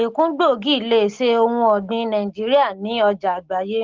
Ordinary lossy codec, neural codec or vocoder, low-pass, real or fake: Opus, 16 kbps; none; 7.2 kHz; real